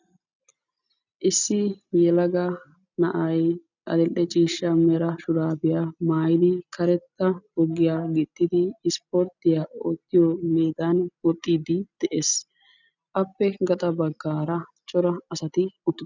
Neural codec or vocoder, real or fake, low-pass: none; real; 7.2 kHz